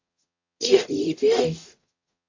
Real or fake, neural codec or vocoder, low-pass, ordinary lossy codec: fake; codec, 44.1 kHz, 0.9 kbps, DAC; 7.2 kHz; AAC, 48 kbps